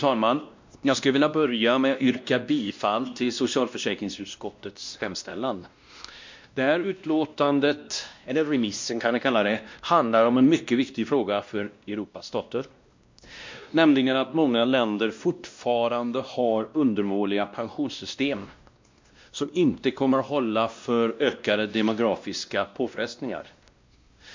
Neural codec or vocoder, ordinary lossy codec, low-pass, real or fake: codec, 16 kHz, 1 kbps, X-Codec, WavLM features, trained on Multilingual LibriSpeech; MP3, 64 kbps; 7.2 kHz; fake